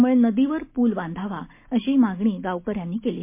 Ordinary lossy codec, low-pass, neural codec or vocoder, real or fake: MP3, 24 kbps; 3.6 kHz; none; real